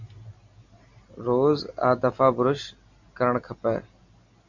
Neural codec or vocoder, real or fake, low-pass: none; real; 7.2 kHz